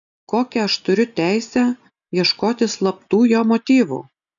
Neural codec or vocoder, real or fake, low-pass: none; real; 7.2 kHz